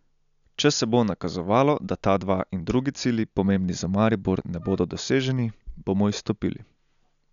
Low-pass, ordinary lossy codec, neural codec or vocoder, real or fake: 7.2 kHz; none; none; real